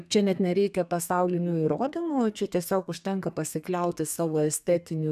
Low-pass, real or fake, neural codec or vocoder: 14.4 kHz; fake; codec, 32 kHz, 1.9 kbps, SNAC